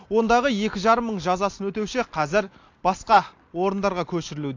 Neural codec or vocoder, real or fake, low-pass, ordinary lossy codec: none; real; 7.2 kHz; AAC, 48 kbps